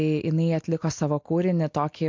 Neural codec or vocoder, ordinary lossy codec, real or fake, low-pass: none; MP3, 48 kbps; real; 7.2 kHz